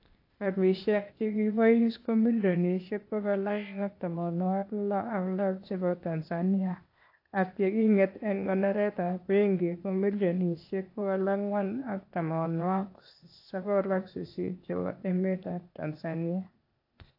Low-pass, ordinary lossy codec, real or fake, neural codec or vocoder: 5.4 kHz; AAC, 32 kbps; fake; codec, 16 kHz, 0.8 kbps, ZipCodec